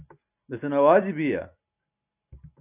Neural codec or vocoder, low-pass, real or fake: none; 3.6 kHz; real